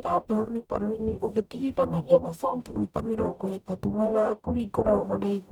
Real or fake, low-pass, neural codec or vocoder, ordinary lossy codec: fake; 19.8 kHz; codec, 44.1 kHz, 0.9 kbps, DAC; none